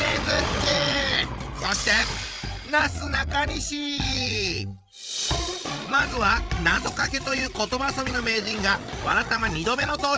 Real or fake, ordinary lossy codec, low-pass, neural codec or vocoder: fake; none; none; codec, 16 kHz, 8 kbps, FreqCodec, larger model